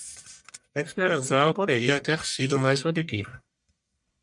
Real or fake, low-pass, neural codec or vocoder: fake; 10.8 kHz; codec, 44.1 kHz, 1.7 kbps, Pupu-Codec